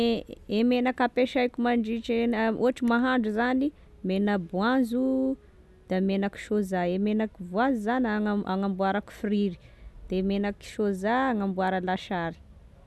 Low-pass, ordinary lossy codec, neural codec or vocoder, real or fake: none; none; none; real